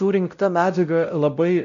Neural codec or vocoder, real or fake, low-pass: codec, 16 kHz, 0.5 kbps, X-Codec, WavLM features, trained on Multilingual LibriSpeech; fake; 7.2 kHz